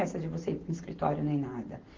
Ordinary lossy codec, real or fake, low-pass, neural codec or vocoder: Opus, 16 kbps; real; 7.2 kHz; none